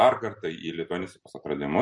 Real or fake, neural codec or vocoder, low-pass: fake; vocoder, 44.1 kHz, 128 mel bands every 256 samples, BigVGAN v2; 10.8 kHz